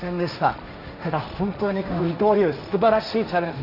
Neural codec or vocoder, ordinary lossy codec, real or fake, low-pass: codec, 16 kHz, 1.1 kbps, Voila-Tokenizer; Opus, 64 kbps; fake; 5.4 kHz